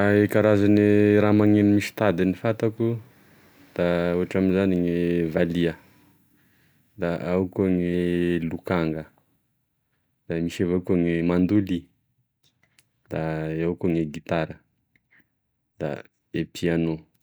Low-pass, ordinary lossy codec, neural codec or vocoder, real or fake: none; none; none; real